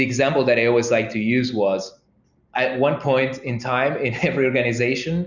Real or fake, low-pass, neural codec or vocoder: real; 7.2 kHz; none